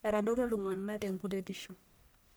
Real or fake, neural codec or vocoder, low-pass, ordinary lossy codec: fake; codec, 44.1 kHz, 1.7 kbps, Pupu-Codec; none; none